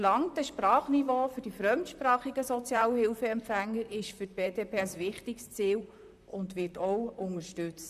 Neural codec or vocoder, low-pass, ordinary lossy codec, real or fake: vocoder, 44.1 kHz, 128 mel bands, Pupu-Vocoder; 14.4 kHz; MP3, 96 kbps; fake